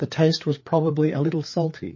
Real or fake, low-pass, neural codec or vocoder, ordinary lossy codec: fake; 7.2 kHz; codec, 16 kHz, 4 kbps, FreqCodec, larger model; MP3, 32 kbps